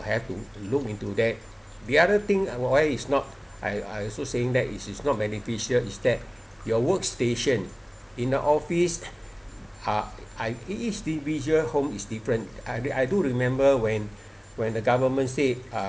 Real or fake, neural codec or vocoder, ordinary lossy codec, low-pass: real; none; none; none